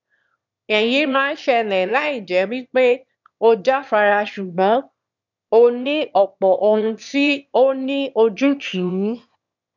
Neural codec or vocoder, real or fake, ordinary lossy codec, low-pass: autoencoder, 22.05 kHz, a latent of 192 numbers a frame, VITS, trained on one speaker; fake; none; 7.2 kHz